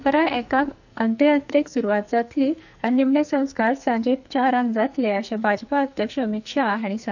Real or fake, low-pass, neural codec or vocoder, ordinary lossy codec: fake; 7.2 kHz; codec, 44.1 kHz, 2.6 kbps, SNAC; none